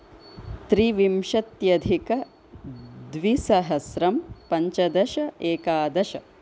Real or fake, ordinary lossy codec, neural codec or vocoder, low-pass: real; none; none; none